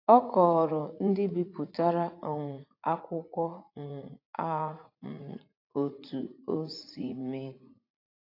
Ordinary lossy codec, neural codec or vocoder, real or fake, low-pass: AAC, 32 kbps; vocoder, 44.1 kHz, 80 mel bands, Vocos; fake; 5.4 kHz